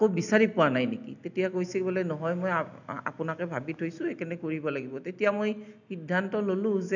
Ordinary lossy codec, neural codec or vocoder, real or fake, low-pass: none; none; real; 7.2 kHz